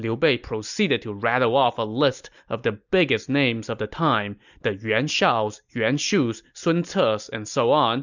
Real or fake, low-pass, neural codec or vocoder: real; 7.2 kHz; none